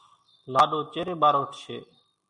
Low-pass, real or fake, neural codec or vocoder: 10.8 kHz; real; none